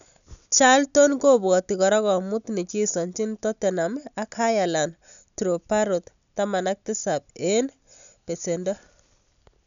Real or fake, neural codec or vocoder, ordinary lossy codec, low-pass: real; none; none; 7.2 kHz